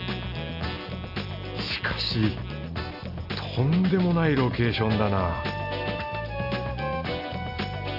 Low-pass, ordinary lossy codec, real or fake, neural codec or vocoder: 5.4 kHz; none; real; none